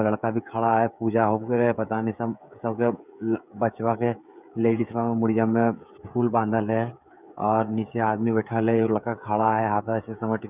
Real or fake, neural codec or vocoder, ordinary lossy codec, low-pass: fake; codec, 16 kHz, 16 kbps, FreqCodec, smaller model; none; 3.6 kHz